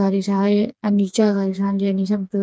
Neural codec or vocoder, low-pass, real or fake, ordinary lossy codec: codec, 16 kHz, 4 kbps, FreqCodec, smaller model; none; fake; none